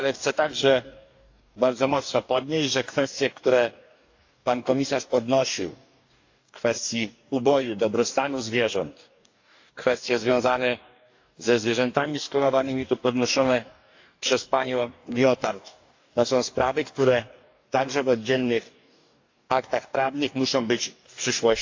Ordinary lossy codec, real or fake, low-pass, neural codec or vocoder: AAC, 48 kbps; fake; 7.2 kHz; codec, 44.1 kHz, 2.6 kbps, DAC